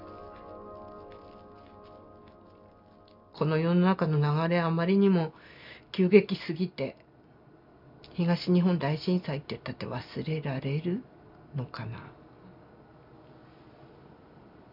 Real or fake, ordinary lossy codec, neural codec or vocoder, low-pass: real; none; none; 5.4 kHz